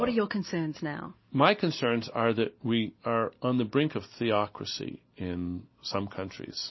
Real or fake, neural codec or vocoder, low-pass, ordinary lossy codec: real; none; 7.2 kHz; MP3, 24 kbps